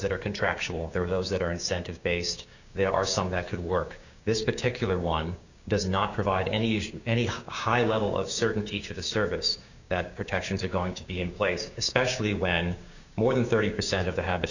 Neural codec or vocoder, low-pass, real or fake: codec, 16 kHz, 6 kbps, DAC; 7.2 kHz; fake